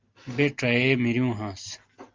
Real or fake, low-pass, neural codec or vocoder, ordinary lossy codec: real; 7.2 kHz; none; Opus, 32 kbps